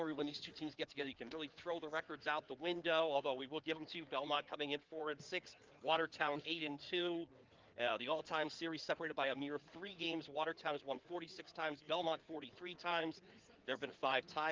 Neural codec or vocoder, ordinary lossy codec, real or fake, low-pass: codec, 16 kHz in and 24 kHz out, 2.2 kbps, FireRedTTS-2 codec; Opus, 32 kbps; fake; 7.2 kHz